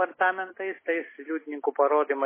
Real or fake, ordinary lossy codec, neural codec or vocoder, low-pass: real; MP3, 16 kbps; none; 3.6 kHz